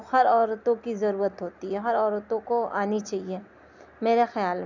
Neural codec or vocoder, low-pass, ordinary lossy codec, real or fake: none; 7.2 kHz; none; real